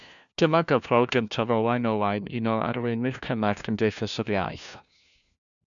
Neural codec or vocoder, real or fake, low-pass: codec, 16 kHz, 1 kbps, FunCodec, trained on LibriTTS, 50 frames a second; fake; 7.2 kHz